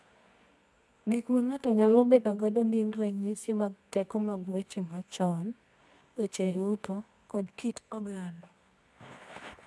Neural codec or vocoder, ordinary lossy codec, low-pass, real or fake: codec, 24 kHz, 0.9 kbps, WavTokenizer, medium music audio release; none; none; fake